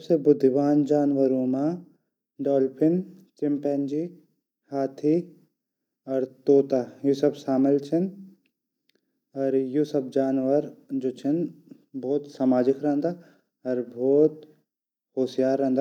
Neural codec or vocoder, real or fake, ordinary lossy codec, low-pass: none; real; none; 19.8 kHz